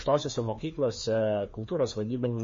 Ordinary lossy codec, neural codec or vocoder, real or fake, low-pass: MP3, 32 kbps; codec, 16 kHz, 2 kbps, FreqCodec, larger model; fake; 7.2 kHz